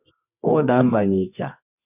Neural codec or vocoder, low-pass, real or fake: codec, 24 kHz, 0.9 kbps, WavTokenizer, medium music audio release; 3.6 kHz; fake